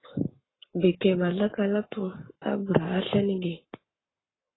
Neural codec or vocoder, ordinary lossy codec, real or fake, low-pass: codec, 44.1 kHz, 7.8 kbps, Pupu-Codec; AAC, 16 kbps; fake; 7.2 kHz